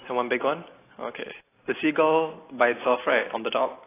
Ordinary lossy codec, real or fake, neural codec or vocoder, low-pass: AAC, 16 kbps; real; none; 3.6 kHz